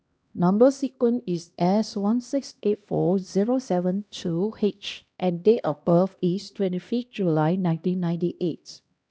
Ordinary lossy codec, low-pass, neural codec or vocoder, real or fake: none; none; codec, 16 kHz, 1 kbps, X-Codec, HuBERT features, trained on LibriSpeech; fake